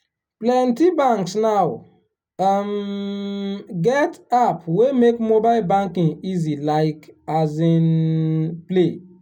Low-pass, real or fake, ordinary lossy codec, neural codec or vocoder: 19.8 kHz; real; none; none